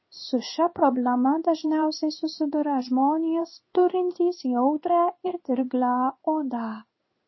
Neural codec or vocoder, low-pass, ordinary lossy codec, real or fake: codec, 16 kHz in and 24 kHz out, 1 kbps, XY-Tokenizer; 7.2 kHz; MP3, 24 kbps; fake